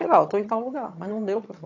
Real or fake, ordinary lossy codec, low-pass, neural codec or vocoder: fake; AAC, 48 kbps; 7.2 kHz; vocoder, 22.05 kHz, 80 mel bands, HiFi-GAN